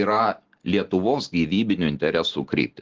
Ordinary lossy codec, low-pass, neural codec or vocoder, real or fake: Opus, 16 kbps; 7.2 kHz; none; real